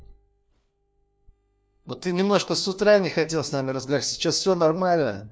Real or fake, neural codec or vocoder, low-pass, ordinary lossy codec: fake; codec, 16 kHz, 1 kbps, FunCodec, trained on LibriTTS, 50 frames a second; none; none